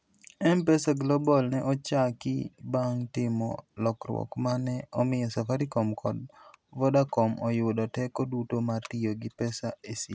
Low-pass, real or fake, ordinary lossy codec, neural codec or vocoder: none; real; none; none